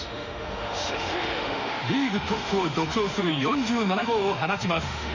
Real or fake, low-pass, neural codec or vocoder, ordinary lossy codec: fake; 7.2 kHz; autoencoder, 48 kHz, 32 numbers a frame, DAC-VAE, trained on Japanese speech; none